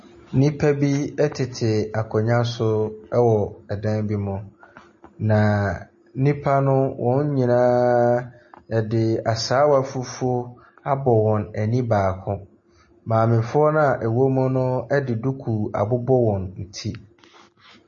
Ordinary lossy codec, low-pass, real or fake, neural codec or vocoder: MP3, 32 kbps; 7.2 kHz; real; none